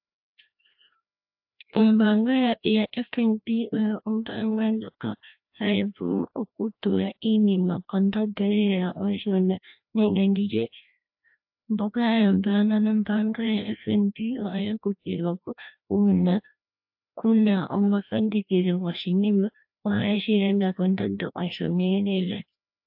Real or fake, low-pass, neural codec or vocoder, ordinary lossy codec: fake; 5.4 kHz; codec, 16 kHz, 1 kbps, FreqCodec, larger model; AAC, 48 kbps